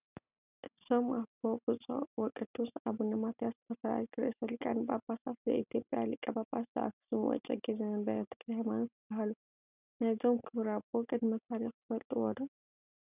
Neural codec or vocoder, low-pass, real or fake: none; 3.6 kHz; real